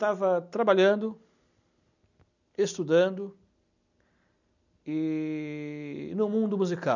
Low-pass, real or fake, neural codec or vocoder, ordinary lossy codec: 7.2 kHz; real; none; none